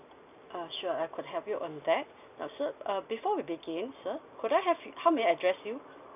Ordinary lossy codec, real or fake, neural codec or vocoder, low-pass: none; real; none; 3.6 kHz